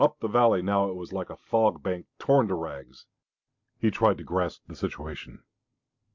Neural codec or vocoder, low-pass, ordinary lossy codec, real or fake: none; 7.2 kHz; MP3, 64 kbps; real